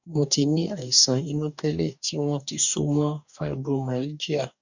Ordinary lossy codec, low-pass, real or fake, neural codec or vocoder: none; 7.2 kHz; fake; codec, 44.1 kHz, 2.6 kbps, DAC